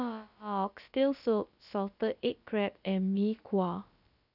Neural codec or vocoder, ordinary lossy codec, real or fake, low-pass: codec, 16 kHz, about 1 kbps, DyCAST, with the encoder's durations; none; fake; 5.4 kHz